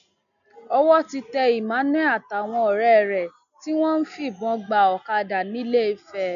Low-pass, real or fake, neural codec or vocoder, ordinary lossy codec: 7.2 kHz; real; none; none